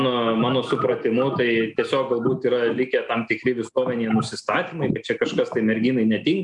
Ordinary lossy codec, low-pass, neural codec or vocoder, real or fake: MP3, 96 kbps; 10.8 kHz; none; real